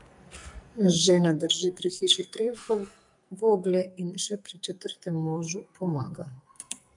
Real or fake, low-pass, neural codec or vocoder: fake; 10.8 kHz; codec, 44.1 kHz, 2.6 kbps, SNAC